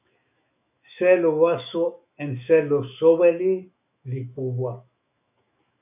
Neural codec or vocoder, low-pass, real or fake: autoencoder, 48 kHz, 128 numbers a frame, DAC-VAE, trained on Japanese speech; 3.6 kHz; fake